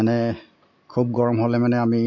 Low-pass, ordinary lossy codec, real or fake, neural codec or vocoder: 7.2 kHz; MP3, 48 kbps; real; none